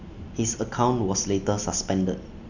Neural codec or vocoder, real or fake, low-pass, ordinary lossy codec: none; real; 7.2 kHz; none